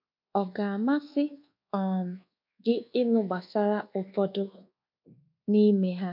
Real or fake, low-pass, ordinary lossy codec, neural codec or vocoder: fake; 5.4 kHz; MP3, 48 kbps; codec, 24 kHz, 1.2 kbps, DualCodec